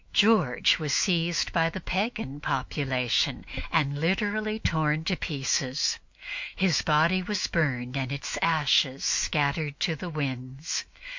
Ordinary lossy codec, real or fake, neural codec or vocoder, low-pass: MP3, 48 kbps; fake; autoencoder, 48 kHz, 128 numbers a frame, DAC-VAE, trained on Japanese speech; 7.2 kHz